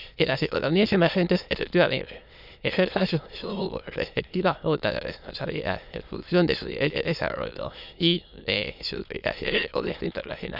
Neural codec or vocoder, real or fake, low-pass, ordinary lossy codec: autoencoder, 22.05 kHz, a latent of 192 numbers a frame, VITS, trained on many speakers; fake; 5.4 kHz; none